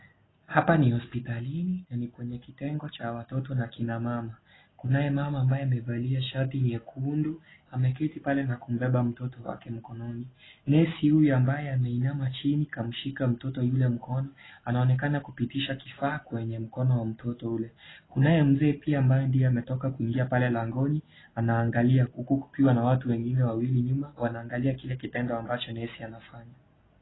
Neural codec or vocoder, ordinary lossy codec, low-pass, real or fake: none; AAC, 16 kbps; 7.2 kHz; real